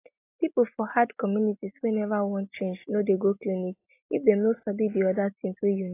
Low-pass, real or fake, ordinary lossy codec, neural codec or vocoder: 3.6 kHz; real; AAC, 24 kbps; none